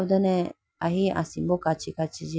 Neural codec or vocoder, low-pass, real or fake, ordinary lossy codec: none; none; real; none